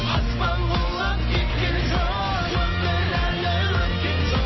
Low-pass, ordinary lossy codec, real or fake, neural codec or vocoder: 7.2 kHz; MP3, 24 kbps; real; none